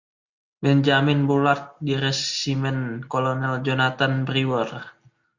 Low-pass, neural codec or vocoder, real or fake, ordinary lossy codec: 7.2 kHz; none; real; Opus, 64 kbps